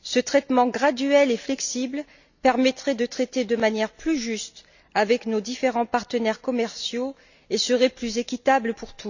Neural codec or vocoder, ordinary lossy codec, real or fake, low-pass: none; none; real; 7.2 kHz